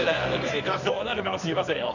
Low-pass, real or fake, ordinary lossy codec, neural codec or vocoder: 7.2 kHz; fake; none; codec, 24 kHz, 0.9 kbps, WavTokenizer, medium music audio release